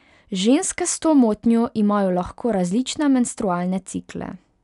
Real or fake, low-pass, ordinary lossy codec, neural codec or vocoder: real; 10.8 kHz; none; none